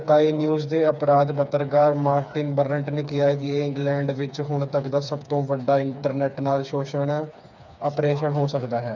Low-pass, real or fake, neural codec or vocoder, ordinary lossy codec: 7.2 kHz; fake; codec, 16 kHz, 4 kbps, FreqCodec, smaller model; none